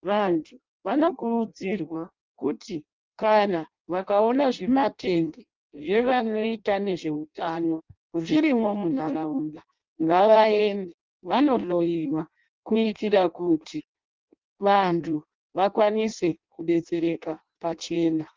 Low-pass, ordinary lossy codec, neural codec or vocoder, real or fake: 7.2 kHz; Opus, 24 kbps; codec, 16 kHz in and 24 kHz out, 0.6 kbps, FireRedTTS-2 codec; fake